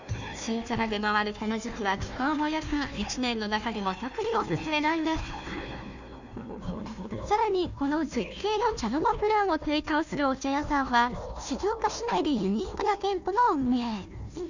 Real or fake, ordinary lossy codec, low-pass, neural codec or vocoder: fake; none; 7.2 kHz; codec, 16 kHz, 1 kbps, FunCodec, trained on Chinese and English, 50 frames a second